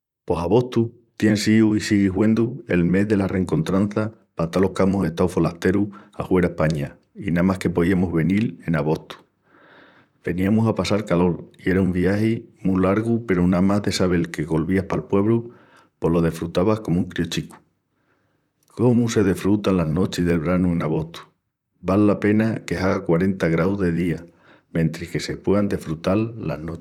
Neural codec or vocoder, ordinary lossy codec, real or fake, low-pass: vocoder, 44.1 kHz, 128 mel bands, Pupu-Vocoder; none; fake; 19.8 kHz